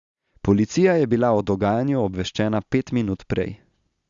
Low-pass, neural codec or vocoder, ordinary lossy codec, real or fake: 7.2 kHz; none; Opus, 64 kbps; real